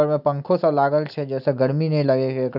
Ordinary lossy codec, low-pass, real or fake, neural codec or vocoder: none; 5.4 kHz; real; none